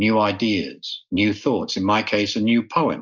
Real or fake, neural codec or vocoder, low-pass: real; none; 7.2 kHz